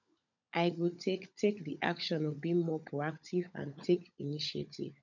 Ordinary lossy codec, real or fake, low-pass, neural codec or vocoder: none; fake; 7.2 kHz; codec, 16 kHz, 16 kbps, FunCodec, trained on LibriTTS, 50 frames a second